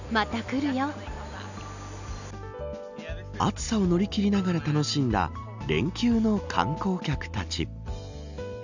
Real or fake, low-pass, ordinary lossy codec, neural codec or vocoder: real; 7.2 kHz; none; none